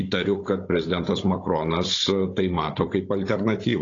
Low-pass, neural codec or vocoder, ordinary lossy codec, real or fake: 7.2 kHz; codec, 16 kHz, 16 kbps, FunCodec, trained on Chinese and English, 50 frames a second; AAC, 32 kbps; fake